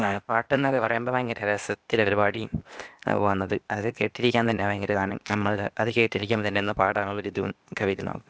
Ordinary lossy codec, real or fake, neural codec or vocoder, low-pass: none; fake; codec, 16 kHz, 0.8 kbps, ZipCodec; none